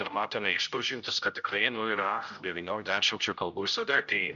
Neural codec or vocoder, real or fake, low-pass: codec, 16 kHz, 0.5 kbps, X-Codec, HuBERT features, trained on general audio; fake; 7.2 kHz